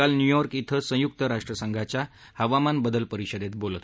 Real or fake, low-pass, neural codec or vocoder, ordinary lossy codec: real; none; none; none